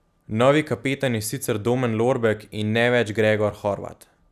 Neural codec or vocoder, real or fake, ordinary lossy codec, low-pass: none; real; none; 14.4 kHz